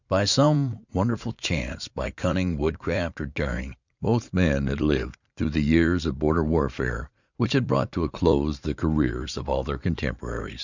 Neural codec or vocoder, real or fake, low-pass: vocoder, 44.1 kHz, 80 mel bands, Vocos; fake; 7.2 kHz